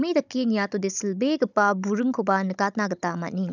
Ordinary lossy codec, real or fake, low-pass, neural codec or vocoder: none; fake; 7.2 kHz; codec, 16 kHz, 16 kbps, FunCodec, trained on Chinese and English, 50 frames a second